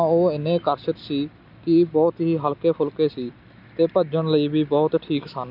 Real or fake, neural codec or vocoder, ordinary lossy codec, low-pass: real; none; none; 5.4 kHz